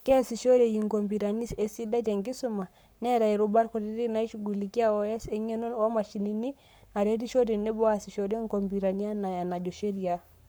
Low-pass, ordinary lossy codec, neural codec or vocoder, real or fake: none; none; codec, 44.1 kHz, 7.8 kbps, Pupu-Codec; fake